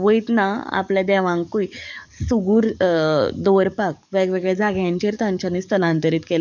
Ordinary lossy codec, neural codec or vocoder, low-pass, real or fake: none; codec, 44.1 kHz, 7.8 kbps, DAC; 7.2 kHz; fake